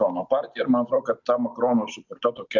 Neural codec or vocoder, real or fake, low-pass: none; real; 7.2 kHz